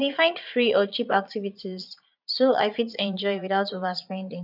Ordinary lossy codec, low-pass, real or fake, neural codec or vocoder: none; 5.4 kHz; fake; vocoder, 22.05 kHz, 80 mel bands, Vocos